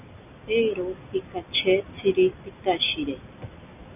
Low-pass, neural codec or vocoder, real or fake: 3.6 kHz; none; real